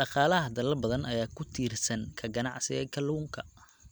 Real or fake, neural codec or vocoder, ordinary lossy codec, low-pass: real; none; none; none